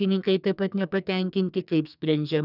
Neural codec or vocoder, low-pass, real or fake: codec, 44.1 kHz, 2.6 kbps, SNAC; 5.4 kHz; fake